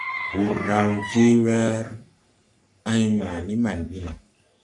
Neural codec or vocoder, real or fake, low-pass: codec, 44.1 kHz, 3.4 kbps, Pupu-Codec; fake; 10.8 kHz